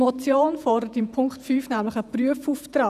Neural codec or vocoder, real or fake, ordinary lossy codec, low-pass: vocoder, 48 kHz, 128 mel bands, Vocos; fake; none; 14.4 kHz